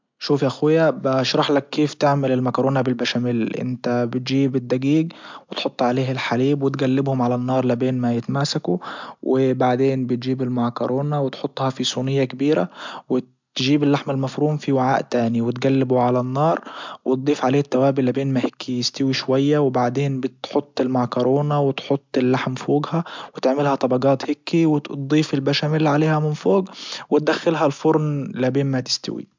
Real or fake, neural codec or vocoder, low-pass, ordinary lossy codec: real; none; 7.2 kHz; MP3, 64 kbps